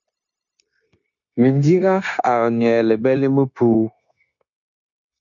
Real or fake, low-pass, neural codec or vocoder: fake; 7.2 kHz; codec, 16 kHz, 0.9 kbps, LongCat-Audio-Codec